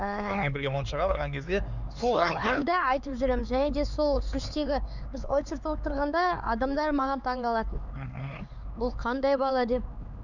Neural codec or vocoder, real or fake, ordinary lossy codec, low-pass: codec, 16 kHz, 4 kbps, X-Codec, HuBERT features, trained on LibriSpeech; fake; none; 7.2 kHz